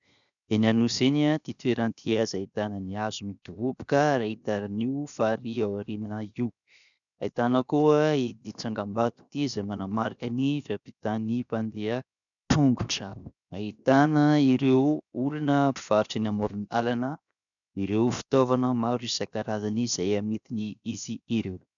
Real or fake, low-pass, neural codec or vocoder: fake; 7.2 kHz; codec, 16 kHz, 0.7 kbps, FocalCodec